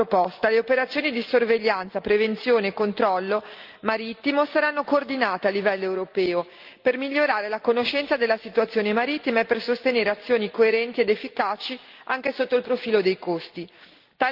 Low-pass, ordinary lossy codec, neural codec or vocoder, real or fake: 5.4 kHz; Opus, 32 kbps; none; real